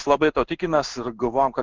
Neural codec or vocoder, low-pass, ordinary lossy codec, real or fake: codec, 16 kHz in and 24 kHz out, 1 kbps, XY-Tokenizer; 7.2 kHz; Opus, 24 kbps; fake